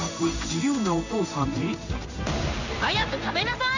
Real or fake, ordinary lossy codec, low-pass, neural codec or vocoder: fake; none; 7.2 kHz; codec, 16 kHz in and 24 kHz out, 1 kbps, XY-Tokenizer